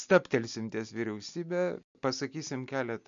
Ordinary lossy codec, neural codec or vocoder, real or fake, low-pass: MP3, 64 kbps; none; real; 7.2 kHz